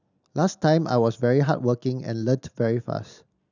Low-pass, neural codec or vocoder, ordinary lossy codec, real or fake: 7.2 kHz; none; none; real